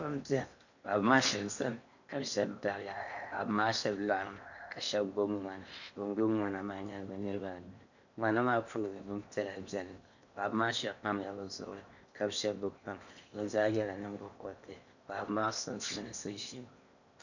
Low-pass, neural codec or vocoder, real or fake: 7.2 kHz; codec, 16 kHz in and 24 kHz out, 0.8 kbps, FocalCodec, streaming, 65536 codes; fake